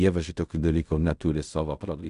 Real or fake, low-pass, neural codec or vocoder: fake; 10.8 kHz; codec, 16 kHz in and 24 kHz out, 0.4 kbps, LongCat-Audio-Codec, fine tuned four codebook decoder